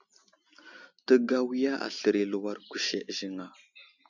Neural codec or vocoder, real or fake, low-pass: none; real; 7.2 kHz